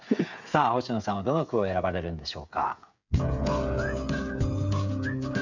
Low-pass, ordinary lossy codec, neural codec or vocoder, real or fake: 7.2 kHz; none; codec, 16 kHz, 8 kbps, FreqCodec, smaller model; fake